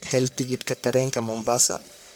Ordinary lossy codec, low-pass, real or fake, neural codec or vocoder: none; none; fake; codec, 44.1 kHz, 1.7 kbps, Pupu-Codec